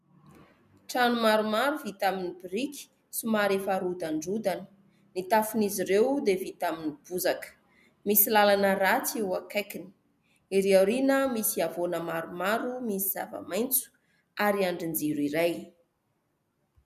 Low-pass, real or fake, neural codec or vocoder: 14.4 kHz; real; none